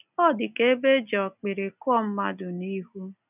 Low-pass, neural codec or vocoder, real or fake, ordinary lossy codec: 3.6 kHz; none; real; none